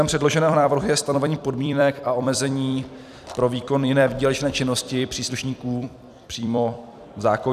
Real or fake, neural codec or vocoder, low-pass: fake; vocoder, 44.1 kHz, 128 mel bands every 256 samples, BigVGAN v2; 14.4 kHz